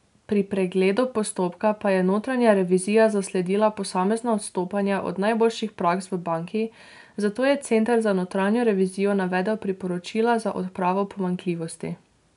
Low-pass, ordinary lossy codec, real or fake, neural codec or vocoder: 10.8 kHz; none; real; none